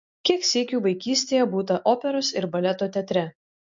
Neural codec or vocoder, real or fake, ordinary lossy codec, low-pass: none; real; MP3, 48 kbps; 7.2 kHz